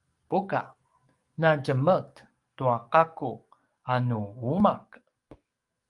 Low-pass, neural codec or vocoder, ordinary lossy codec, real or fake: 10.8 kHz; codec, 44.1 kHz, 7.8 kbps, DAC; Opus, 32 kbps; fake